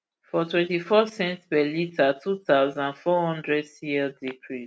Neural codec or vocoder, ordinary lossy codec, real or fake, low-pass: none; none; real; none